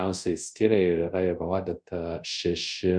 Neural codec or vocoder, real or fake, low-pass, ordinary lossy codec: codec, 24 kHz, 0.5 kbps, DualCodec; fake; 9.9 kHz; Opus, 64 kbps